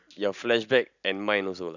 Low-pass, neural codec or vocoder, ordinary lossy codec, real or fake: 7.2 kHz; none; none; real